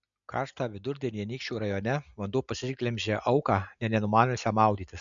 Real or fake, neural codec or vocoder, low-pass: real; none; 7.2 kHz